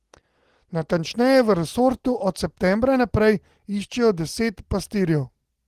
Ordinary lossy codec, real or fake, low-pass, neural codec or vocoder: Opus, 16 kbps; real; 14.4 kHz; none